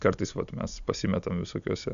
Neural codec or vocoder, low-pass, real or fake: none; 7.2 kHz; real